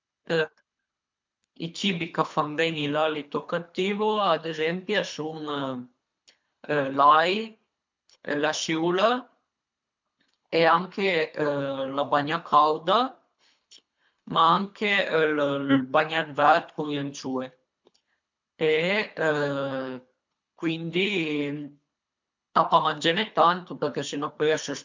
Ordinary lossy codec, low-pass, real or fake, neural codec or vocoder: MP3, 64 kbps; 7.2 kHz; fake; codec, 24 kHz, 3 kbps, HILCodec